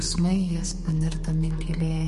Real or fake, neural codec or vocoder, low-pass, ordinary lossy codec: fake; codec, 32 kHz, 1.9 kbps, SNAC; 14.4 kHz; MP3, 48 kbps